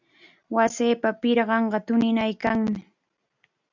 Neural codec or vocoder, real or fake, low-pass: none; real; 7.2 kHz